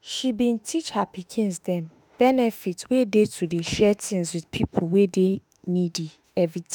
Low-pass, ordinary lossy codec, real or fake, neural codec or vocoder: none; none; fake; autoencoder, 48 kHz, 32 numbers a frame, DAC-VAE, trained on Japanese speech